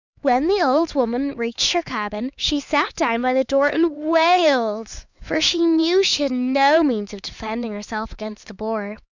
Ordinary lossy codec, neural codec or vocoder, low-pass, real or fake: Opus, 64 kbps; codec, 16 kHz, 4 kbps, X-Codec, HuBERT features, trained on LibriSpeech; 7.2 kHz; fake